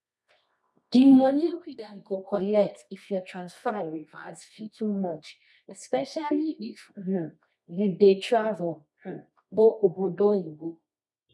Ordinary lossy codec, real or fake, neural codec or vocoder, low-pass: none; fake; codec, 24 kHz, 0.9 kbps, WavTokenizer, medium music audio release; none